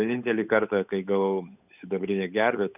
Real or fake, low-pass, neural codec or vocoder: fake; 3.6 kHz; codec, 16 kHz, 8 kbps, FunCodec, trained on Chinese and English, 25 frames a second